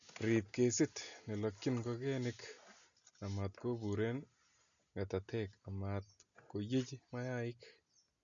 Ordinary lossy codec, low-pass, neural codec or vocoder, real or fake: none; 7.2 kHz; none; real